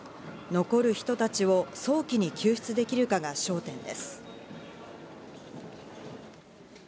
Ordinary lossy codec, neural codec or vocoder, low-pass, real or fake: none; none; none; real